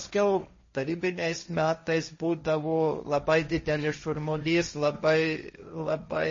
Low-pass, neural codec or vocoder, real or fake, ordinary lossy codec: 7.2 kHz; codec, 16 kHz, 1.1 kbps, Voila-Tokenizer; fake; MP3, 32 kbps